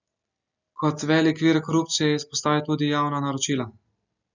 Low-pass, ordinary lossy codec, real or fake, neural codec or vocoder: 7.2 kHz; none; real; none